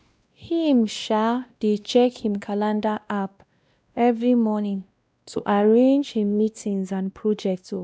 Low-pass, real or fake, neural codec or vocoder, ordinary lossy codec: none; fake; codec, 16 kHz, 1 kbps, X-Codec, WavLM features, trained on Multilingual LibriSpeech; none